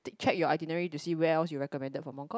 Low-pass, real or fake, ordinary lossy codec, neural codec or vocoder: none; real; none; none